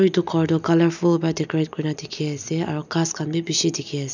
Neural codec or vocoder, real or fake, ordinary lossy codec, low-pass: none; real; none; 7.2 kHz